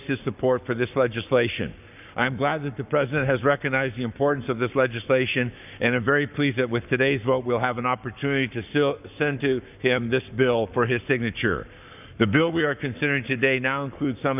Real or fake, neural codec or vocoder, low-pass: fake; codec, 44.1 kHz, 7.8 kbps, Pupu-Codec; 3.6 kHz